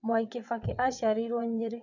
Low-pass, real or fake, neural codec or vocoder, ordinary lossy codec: 7.2 kHz; fake; vocoder, 22.05 kHz, 80 mel bands, WaveNeXt; none